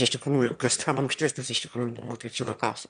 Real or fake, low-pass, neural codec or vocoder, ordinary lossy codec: fake; 9.9 kHz; autoencoder, 22.05 kHz, a latent of 192 numbers a frame, VITS, trained on one speaker; MP3, 96 kbps